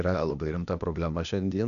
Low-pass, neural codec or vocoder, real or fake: 7.2 kHz; codec, 16 kHz, 0.8 kbps, ZipCodec; fake